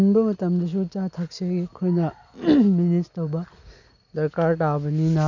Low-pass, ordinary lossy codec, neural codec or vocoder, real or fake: 7.2 kHz; none; none; real